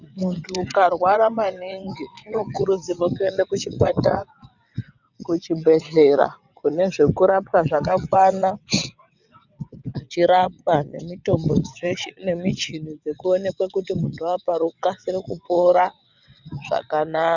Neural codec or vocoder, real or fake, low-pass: vocoder, 22.05 kHz, 80 mel bands, WaveNeXt; fake; 7.2 kHz